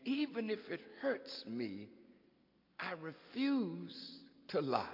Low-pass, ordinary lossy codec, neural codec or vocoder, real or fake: 5.4 kHz; MP3, 32 kbps; none; real